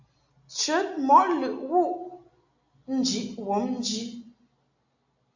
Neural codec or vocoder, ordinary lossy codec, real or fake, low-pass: none; AAC, 48 kbps; real; 7.2 kHz